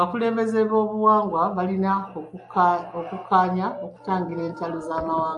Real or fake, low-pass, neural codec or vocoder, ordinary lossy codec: real; 10.8 kHz; none; AAC, 64 kbps